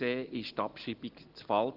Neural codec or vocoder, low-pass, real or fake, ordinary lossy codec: none; 5.4 kHz; real; Opus, 16 kbps